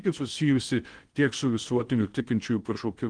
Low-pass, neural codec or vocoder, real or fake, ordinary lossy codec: 9.9 kHz; codec, 16 kHz in and 24 kHz out, 0.6 kbps, FocalCodec, streaming, 2048 codes; fake; Opus, 24 kbps